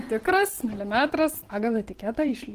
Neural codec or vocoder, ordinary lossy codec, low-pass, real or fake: vocoder, 44.1 kHz, 128 mel bands every 512 samples, BigVGAN v2; Opus, 16 kbps; 14.4 kHz; fake